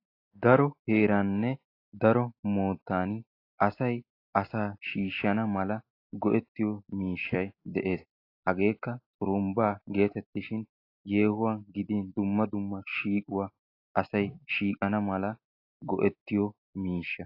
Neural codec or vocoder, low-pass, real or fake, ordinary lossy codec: none; 5.4 kHz; real; AAC, 32 kbps